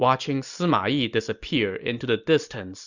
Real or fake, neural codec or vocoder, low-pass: real; none; 7.2 kHz